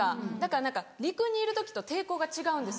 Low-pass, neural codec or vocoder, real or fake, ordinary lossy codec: none; none; real; none